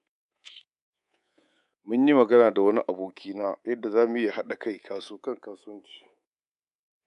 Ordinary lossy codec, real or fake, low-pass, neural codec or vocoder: none; fake; 10.8 kHz; codec, 24 kHz, 3.1 kbps, DualCodec